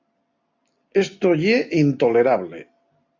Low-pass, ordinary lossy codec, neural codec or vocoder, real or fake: 7.2 kHz; AAC, 48 kbps; none; real